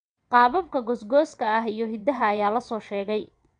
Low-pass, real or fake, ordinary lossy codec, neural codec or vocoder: 9.9 kHz; fake; none; vocoder, 22.05 kHz, 80 mel bands, WaveNeXt